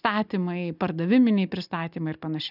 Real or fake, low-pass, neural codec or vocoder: real; 5.4 kHz; none